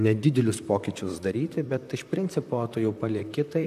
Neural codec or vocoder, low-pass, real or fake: vocoder, 44.1 kHz, 128 mel bands, Pupu-Vocoder; 14.4 kHz; fake